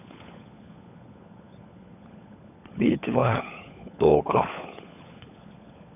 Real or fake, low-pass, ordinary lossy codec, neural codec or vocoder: fake; 3.6 kHz; none; codec, 16 kHz, 16 kbps, FunCodec, trained on LibriTTS, 50 frames a second